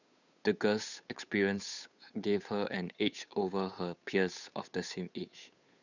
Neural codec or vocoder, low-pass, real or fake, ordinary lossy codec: codec, 16 kHz, 8 kbps, FunCodec, trained on Chinese and English, 25 frames a second; 7.2 kHz; fake; none